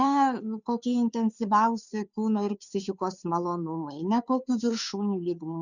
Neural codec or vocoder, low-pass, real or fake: codec, 16 kHz, 2 kbps, FunCodec, trained on Chinese and English, 25 frames a second; 7.2 kHz; fake